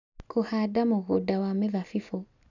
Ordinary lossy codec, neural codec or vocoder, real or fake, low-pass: none; none; real; 7.2 kHz